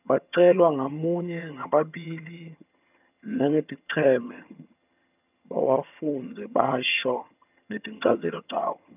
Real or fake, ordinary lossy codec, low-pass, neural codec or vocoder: fake; none; 3.6 kHz; vocoder, 22.05 kHz, 80 mel bands, HiFi-GAN